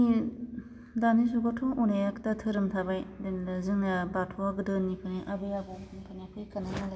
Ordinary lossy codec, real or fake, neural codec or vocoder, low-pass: none; real; none; none